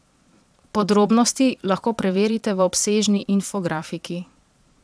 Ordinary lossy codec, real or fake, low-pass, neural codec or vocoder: none; fake; none; vocoder, 22.05 kHz, 80 mel bands, Vocos